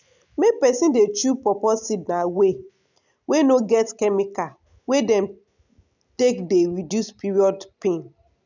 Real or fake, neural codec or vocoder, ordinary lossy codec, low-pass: real; none; none; 7.2 kHz